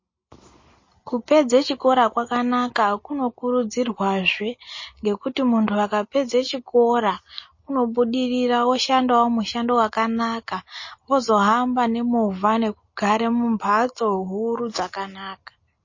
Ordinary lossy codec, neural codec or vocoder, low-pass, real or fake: MP3, 32 kbps; none; 7.2 kHz; real